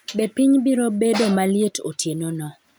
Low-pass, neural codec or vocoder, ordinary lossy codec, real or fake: none; none; none; real